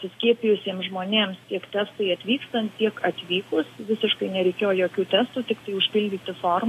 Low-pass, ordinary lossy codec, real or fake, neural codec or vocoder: 14.4 kHz; AAC, 48 kbps; real; none